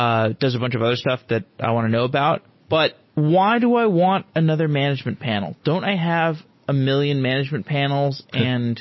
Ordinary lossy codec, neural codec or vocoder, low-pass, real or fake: MP3, 24 kbps; none; 7.2 kHz; real